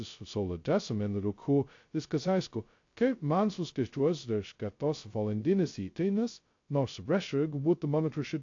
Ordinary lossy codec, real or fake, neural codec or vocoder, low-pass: AAC, 48 kbps; fake; codec, 16 kHz, 0.2 kbps, FocalCodec; 7.2 kHz